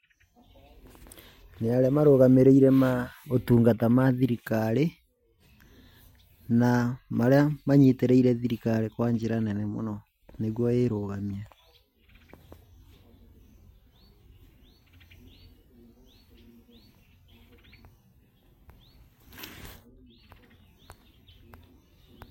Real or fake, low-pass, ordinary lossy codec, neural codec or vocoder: real; 19.8 kHz; MP3, 64 kbps; none